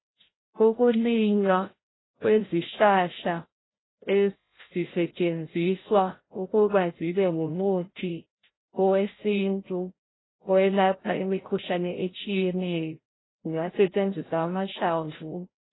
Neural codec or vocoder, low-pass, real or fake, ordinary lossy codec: codec, 16 kHz, 0.5 kbps, FreqCodec, larger model; 7.2 kHz; fake; AAC, 16 kbps